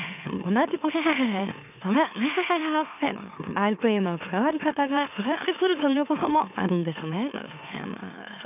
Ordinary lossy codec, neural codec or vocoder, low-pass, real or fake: none; autoencoder, 44.1 kHz, a latent of 192 numbers a frame, MeloTTS; 3.6 kHz; fake